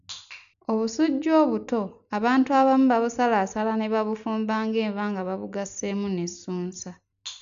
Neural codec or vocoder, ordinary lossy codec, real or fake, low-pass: none; none; real; 7.2 kHz